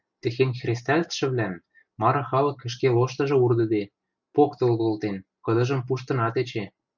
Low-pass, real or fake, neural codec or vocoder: 7.2 kHz; real; none